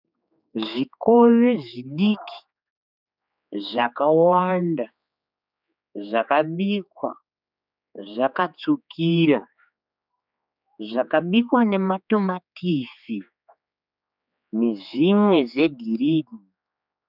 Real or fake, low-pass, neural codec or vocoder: fake; 5.4 kHz; codec, 16 kHz, 4 kbps, X-Codec, HuBERT features, trained on general audio